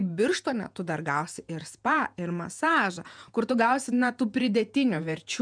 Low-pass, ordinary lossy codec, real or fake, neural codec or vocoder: 9.9 kHz; MP3, 96 kbps; fake; vocoder, 48 kHz, 128 mel bands, Vocos